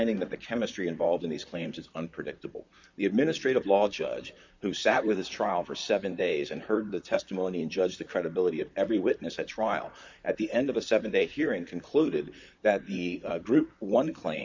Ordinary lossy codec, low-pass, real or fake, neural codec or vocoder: MP3, 64 kbps; 7.2 kHz; fake; vocoder, 44.1 kHz, 128 mel bands, Pupu-Vocoder